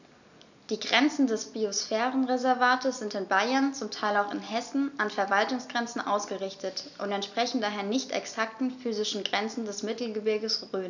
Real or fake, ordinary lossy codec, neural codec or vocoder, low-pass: real; none; none; 7.2 kHz